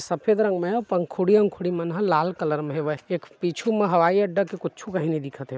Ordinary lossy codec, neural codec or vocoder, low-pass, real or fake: none; none; none; real